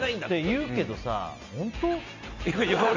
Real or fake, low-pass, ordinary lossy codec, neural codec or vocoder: real; 7.2 kHz; MP3, 64 kbps; none